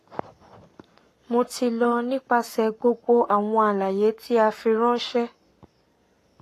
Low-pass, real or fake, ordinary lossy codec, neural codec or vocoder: 14.4 kHz; fake; AAC, 48 kbps; codec, 44.1 kHz, 7.8 kbps, Pupu-Codec